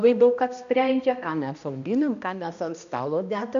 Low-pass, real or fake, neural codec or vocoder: 7.2 kHz; fake; codec, 16 kHz, 1 kbps, X-Codec, HuBERT features, trained on balanced general audio